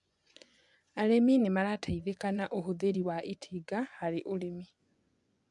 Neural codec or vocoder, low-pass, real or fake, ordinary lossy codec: vocoder, 24 kHz, 100 mel bands, Vocos; 10.8 kHz; fake; MP3, 96 kbps